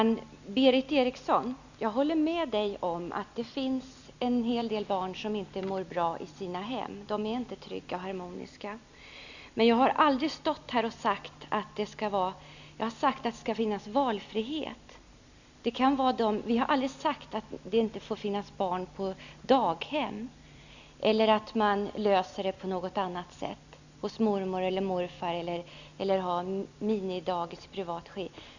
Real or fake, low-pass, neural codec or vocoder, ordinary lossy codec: real; 7.2 kHz; none; none